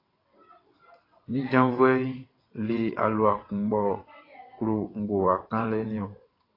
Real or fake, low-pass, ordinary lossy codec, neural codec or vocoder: fake; 5.4 kHz; AAC, 24 kbps; vocoder, 22.05 kHz, 80 mel bands, WaveNeXt